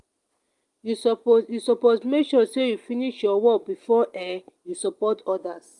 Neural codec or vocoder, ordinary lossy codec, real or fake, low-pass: none; Opus, 32 kbps; real; 10.8 kHz